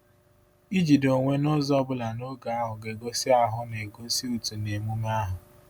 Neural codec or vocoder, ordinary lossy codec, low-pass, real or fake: none; none; none; real